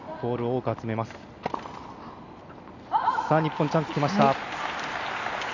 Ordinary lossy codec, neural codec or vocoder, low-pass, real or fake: none; none; 7.2 kHz; real